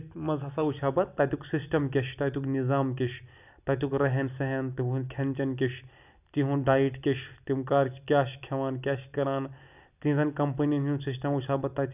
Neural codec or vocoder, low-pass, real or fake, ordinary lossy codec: none; 3.6 kHz; real; none